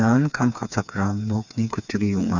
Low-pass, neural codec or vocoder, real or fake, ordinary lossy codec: 7.2 kHz; codec, 16 kHz, 4 kbps, FreqCodec, smaller model; fake; none